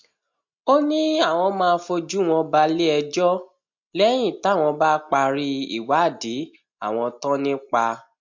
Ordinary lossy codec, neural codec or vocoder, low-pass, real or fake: MP3, 48 kbps; none; 7.2 kHz; real